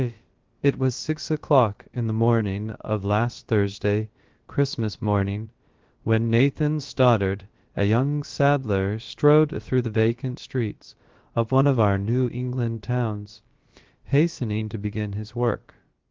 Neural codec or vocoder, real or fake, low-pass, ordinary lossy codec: codec, 16 kHz, about 1 kbps, DyCAST, with the encoder's durations; fake; 7.2 kHz; Opus, 16 kbps